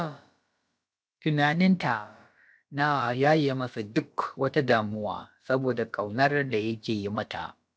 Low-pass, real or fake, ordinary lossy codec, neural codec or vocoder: none; fake; none; codec, 16 kHz, about 1 kbps, DyCAST, with the encoder's durations